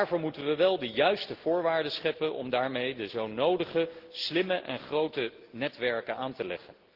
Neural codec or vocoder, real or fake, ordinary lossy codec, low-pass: none; real; Opus, 16 kbps; 5.4 kHz